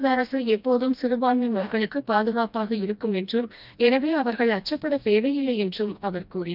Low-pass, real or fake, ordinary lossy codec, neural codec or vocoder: 5.4 kHz; fake; none; codec, 16 kHz, 1 kbps, FreqCodec, smaller model